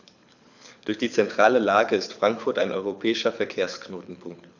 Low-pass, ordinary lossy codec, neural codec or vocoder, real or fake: 7.2 kHz; none; codec, 24 kHz, 6 kbps, HILCodec; fake